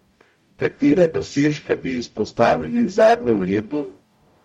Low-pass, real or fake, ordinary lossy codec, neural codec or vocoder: 19.8 kHz; fake; MP3, 64 kbps; codec, 44.1 kHz, 0.9 kbps, DAC